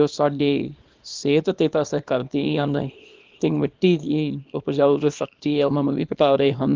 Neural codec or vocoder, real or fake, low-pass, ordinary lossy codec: codec, 24 kHz, 0.9 kbps, WavTokenizer, small release; fake; 7.2 kHz; Opus, 24 kbps